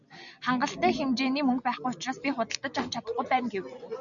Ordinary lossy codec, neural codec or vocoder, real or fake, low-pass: MP3, 96 kbps; none; real; 7.2 kHz